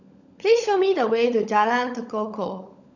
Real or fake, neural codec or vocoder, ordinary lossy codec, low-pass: fake; codec, 16 kHz, 16 kbps, FunCodec, trained on LibriTTS, 50 frames a second; none; 7.2 kHz